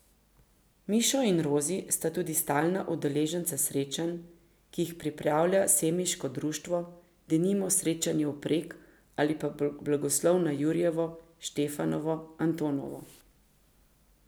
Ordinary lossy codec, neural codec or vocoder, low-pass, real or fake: none; none; none; real